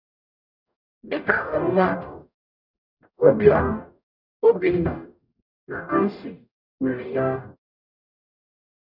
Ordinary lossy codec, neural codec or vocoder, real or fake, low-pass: none; codec, 44.1 kHz, 0.9 kbps, DAC; fake; 5.4 kHz